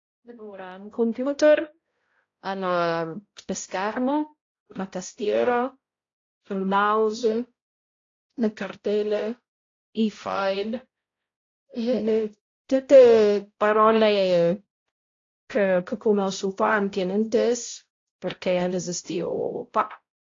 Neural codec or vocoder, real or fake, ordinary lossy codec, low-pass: codec, 16 kHz, 0.5 kbps, X-Codec, HuBERT features, trained on balanced general audio; fake; AAC, 32 kbps; 7.2 kHz